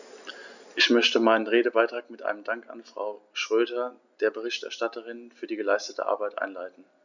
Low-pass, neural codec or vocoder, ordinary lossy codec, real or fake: 7.2 kHz; none; none; real